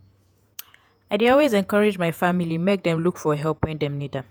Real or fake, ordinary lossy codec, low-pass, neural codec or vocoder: fake; none; none; vocoder, 48 kHz, 128 mel bands, Vocos